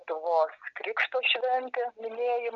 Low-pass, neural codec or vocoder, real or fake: 7.2 kHz; none; real